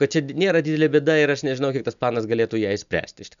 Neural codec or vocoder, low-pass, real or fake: none; 7.2 kHz; real